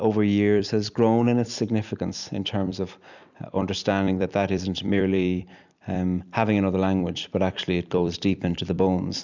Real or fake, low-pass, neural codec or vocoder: fake; 7.2 kHz; vocoder, 44.1 kHz, 128 mel bands every 256 samples, BigVGAN v2